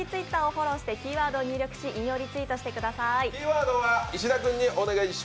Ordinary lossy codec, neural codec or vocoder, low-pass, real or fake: none; none; none; real